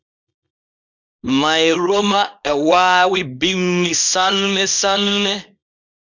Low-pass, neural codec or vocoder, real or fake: 7.2 kHz; codec, 24 kHz, 0.9 kbps, WavTokenizer, small release; fake